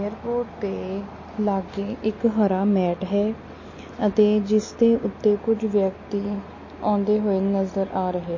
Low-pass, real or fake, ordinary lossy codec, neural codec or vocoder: 7.2 kHz; real; MP3, 32 kbps; none